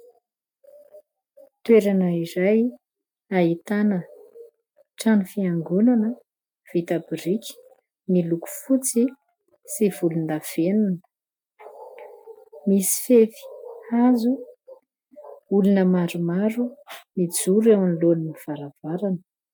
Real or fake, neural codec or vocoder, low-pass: real; none; 19.8 kHz